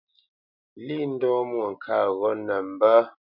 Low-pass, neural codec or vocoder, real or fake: 5.4 kHz; none; real